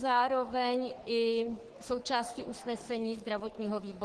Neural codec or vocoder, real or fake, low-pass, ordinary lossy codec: codec, 44.1 kHz, 3.4 kbps, Pupu-Codec; fake; 10.8 kHz; Opus, 16 kbps